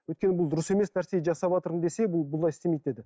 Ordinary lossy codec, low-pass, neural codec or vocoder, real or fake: none; none; none; real